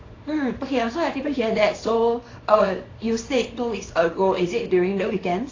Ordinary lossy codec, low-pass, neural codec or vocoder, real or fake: AAC, 32 kbps; 7.2 kHz; codec, 24 kHz, 0.9 kbps, WavTokenizer, small release; fake